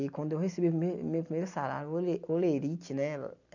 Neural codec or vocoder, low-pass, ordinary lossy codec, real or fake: none; 7.2 kHz; none; real